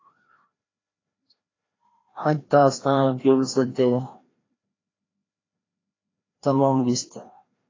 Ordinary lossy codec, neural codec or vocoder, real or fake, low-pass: AAC, 32 kbps; codec, 16 kHz, 1 kbps, FreqCodec, larger model; fake; 7.2 kHz